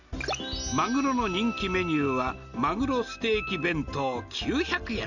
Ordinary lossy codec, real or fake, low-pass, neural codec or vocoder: none; real; 7.2 kHz; none